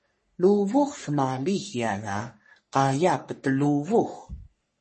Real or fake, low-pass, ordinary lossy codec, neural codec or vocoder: fake; 10.8 kHz; MP3, 32 kbps; codec, 44.1 kHz, 3.4 kbps, Pupu-Codec